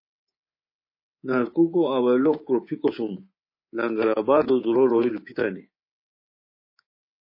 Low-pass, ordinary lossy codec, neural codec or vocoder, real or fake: 5.4 kHz; MP3, 24 kbps; codec, 24 kHz, 3.1 kbps, DualCodec; fake